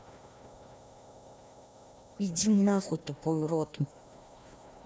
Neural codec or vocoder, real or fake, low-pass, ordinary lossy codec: codec, 16 kHz, 1 kbps, FunCodec, trained on Chinese and English, 50 frames a second; fake; none; none